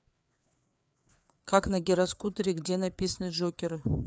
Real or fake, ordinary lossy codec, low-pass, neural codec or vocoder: fake; none; none; codec, 16 kHz, 4 kbps, FreqCodec, larger model